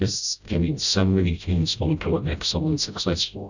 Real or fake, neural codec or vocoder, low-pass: fake; codec, 16 kHz, 0.5 kbps, FreqCodec, smaller model; 7.2 kHz